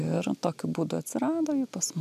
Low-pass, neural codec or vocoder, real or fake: 14.4 kHz; none; real